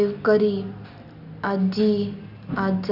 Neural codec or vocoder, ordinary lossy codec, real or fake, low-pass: none; Opus, 64 kbps; real; 5.4 kHz